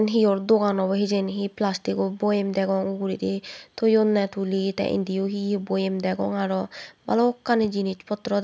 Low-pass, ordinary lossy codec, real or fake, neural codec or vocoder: none; none; real; none